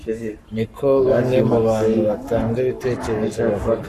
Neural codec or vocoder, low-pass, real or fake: codec, 44.1 kHz, 7.8 kbps, Pupu-Codec; 14.4 kHz; fake